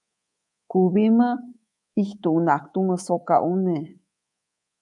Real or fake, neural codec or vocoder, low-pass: fake; codec, 24 kHz, 3.1 kbps, DualCodec; 10.8 kHz